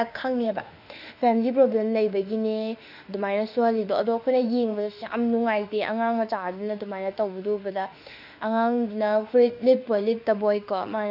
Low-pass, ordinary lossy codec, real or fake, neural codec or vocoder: 5.4 kHz; none; fake; autoencoder, 48 kHz, 32 numbers a frame, DAC-VAE, trained on Japanese speech